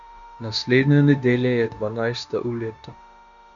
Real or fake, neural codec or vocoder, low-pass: fake; codec, 16 kHz, 0.9 kbps, LongCat-Audio-Codec; 7.2 kHz